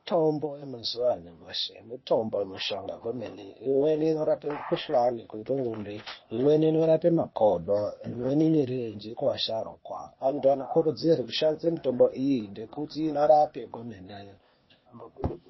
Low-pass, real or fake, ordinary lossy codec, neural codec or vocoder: 7.2 kHz; fake; MP3, 24 kbps; codec, 16 kHz, 0.8 kbps, ZipCodec